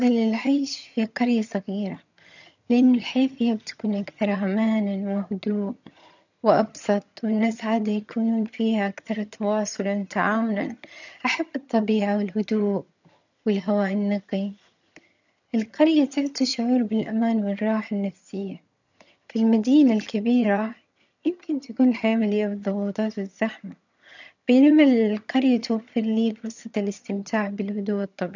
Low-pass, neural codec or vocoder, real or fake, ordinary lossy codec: 7.2 kHz; vocoder, 22.05 kHz, 80 mel bands, HiFi-GAN; fake; none